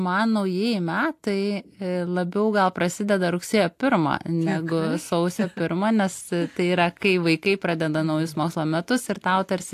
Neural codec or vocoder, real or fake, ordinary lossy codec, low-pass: none; real; AAC, 64 kbps; 14.4 kHz